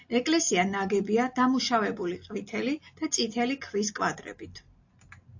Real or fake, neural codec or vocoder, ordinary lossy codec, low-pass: real; none; AAC, 48 kbps; 7.2 kHz